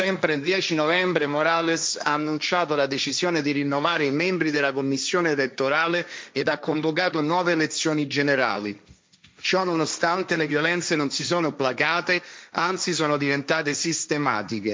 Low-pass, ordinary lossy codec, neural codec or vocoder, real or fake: none; none; codec, 16 kHz, 1.1 kbps, Voila-Tokenizer; fake